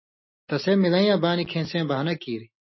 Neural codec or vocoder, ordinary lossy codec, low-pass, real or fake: none; MP3, 24 kbps; 7.2 kHz; real